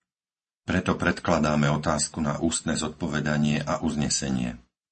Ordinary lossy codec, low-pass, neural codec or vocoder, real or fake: MP3, 32 kbps; 9.9 kHz; none; real